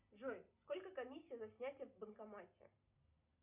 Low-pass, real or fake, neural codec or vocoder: 3.6 kHz; real; none